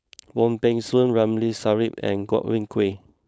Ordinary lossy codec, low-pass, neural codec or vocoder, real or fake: none; none; codec, 16 kHz, 4.8 kbps, FACodec; fake